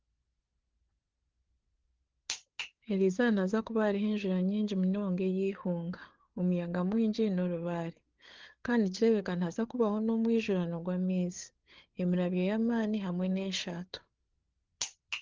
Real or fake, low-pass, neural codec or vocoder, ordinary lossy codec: fake; 7.2 kHz; codec, 16 kHz, 4 kbps, FreqCodec, larger model; Opus, 16 kbps